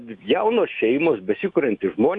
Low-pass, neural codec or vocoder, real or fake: 10.8 kHz; autoencoder, 48 kHz, 128 numbers a frame, DAC-VAE, trained on Japanese speech; fake